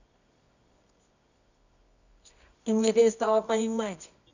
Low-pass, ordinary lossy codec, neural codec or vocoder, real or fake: 7.2 kHz; none; codec, 24 kHz, 0.9 kbps, WavTokenizer, medium music audio release; fake